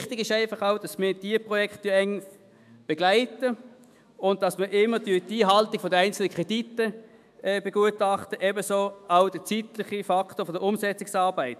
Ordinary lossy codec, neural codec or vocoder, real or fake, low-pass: MP3, 96 kbps; autoencoder, 48 kHz, 128 numbers a frame, DAC-VAE, trained on Japanese speech; fake; 14.4 kHz